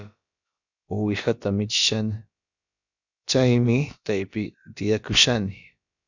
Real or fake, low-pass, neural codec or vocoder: fake; 7.2 kHz; codec, 16 kHz, about 1 kbps, DyCAST, with the encoder's durations